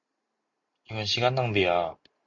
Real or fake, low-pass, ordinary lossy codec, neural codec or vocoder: real; 7.2 kHz; MP3, 48 kbps; none